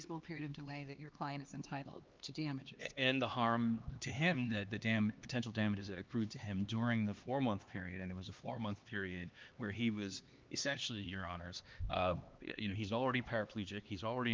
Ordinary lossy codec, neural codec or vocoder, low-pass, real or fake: Opus, 32 kbps; codec, 16 kHz, 2 kbps, X-Codec, HuBERT features, trained on LibriSpeech; 7.2 kHz; fake